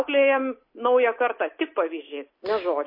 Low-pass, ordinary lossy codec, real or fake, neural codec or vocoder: 5.4 kHz; MP3, 24 kbps; real; none